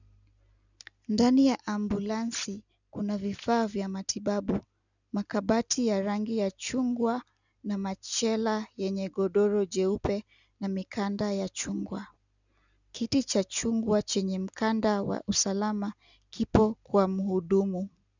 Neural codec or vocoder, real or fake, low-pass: none; real; 7.2 kHz